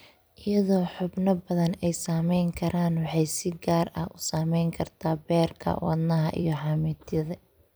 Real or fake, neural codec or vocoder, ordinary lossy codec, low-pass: fake; vocoder, 44.1 kHz, 128 mel bands every 256 samples, BigVGAN v2; none; none